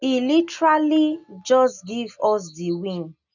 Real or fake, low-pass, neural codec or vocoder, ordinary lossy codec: real; 7.2 kHz; none; none